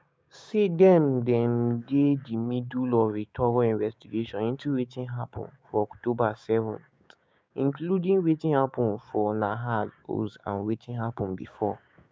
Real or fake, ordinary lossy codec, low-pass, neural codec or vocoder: fake; none; none; codec, 16 kHz, 6 kbps, DAC